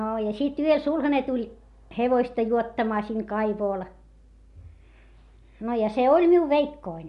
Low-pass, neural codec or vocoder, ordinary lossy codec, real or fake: 10.8 kHz; none; MP3, 64 kbps; real